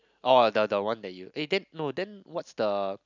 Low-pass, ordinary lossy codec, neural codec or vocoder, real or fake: 7.2 kHz; none; none; real